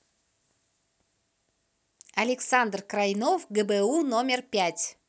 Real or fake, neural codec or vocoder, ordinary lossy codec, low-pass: real; none; none; none